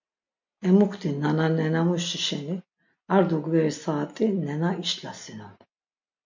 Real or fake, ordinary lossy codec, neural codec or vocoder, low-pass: real; MP3, 48 kbps; none; 7.2 kHz